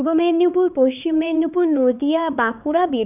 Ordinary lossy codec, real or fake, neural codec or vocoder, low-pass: none; fake; codec, 16 kHz, 4 kbps, X-Codec, HuBERT features, trained on LibriSpeech; 3.6 kHz